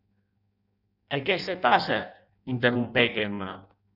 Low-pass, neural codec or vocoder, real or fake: 5.4 kHz; codec, 16 kHz in and 24 kHz out, 0.6 kbps, FireRedTTS-2 codec; fake